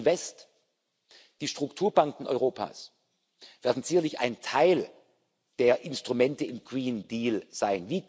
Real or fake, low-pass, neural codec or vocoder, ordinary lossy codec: real; none; none; none